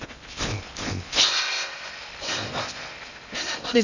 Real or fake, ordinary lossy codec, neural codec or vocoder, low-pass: fake; none; codec, 16 kHz in and 24 kHz out, 0.6 kbps, FocalCodec, streaming, 2048 codes; 7.2 kHz